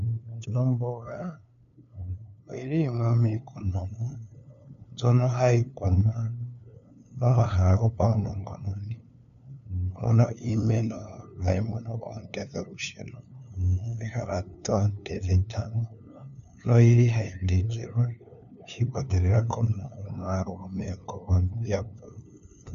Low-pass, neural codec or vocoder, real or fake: 7.2 kHz; codec, 16 kHz, 2 kbps, FunCodec, trained on LibriTTS, 25 frames a second; fake